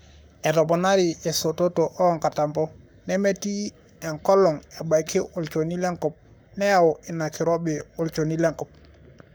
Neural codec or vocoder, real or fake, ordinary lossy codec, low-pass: codec, 44.1 kHz, 7.8 kbps, Pupu-Codec; fake; none; none